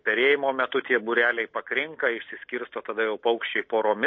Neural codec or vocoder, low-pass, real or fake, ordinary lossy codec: none; 7.2 kHz; real; MP3, 32 kbps